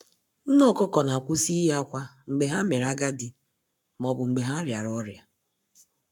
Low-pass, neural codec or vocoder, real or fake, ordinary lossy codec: 19.8 kHz; codec, 44.1 kHz, 7.8 kbps, Pupu-Codec; fake; none